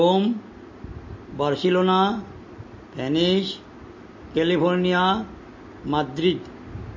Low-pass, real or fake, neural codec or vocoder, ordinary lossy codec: 7.2 kHz; real; none; MP3, 32 kbps